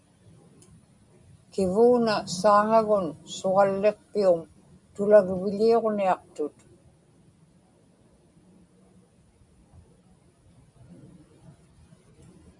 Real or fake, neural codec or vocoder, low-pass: real; none; 10.8 kHz